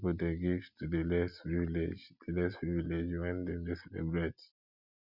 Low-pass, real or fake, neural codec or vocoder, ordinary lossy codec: 5.4 kHz; real; none; none